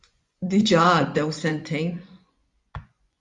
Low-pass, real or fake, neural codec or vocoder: 10.8 kHz; fake; vocoder, 24 kHz, 100 mel bands, Vocos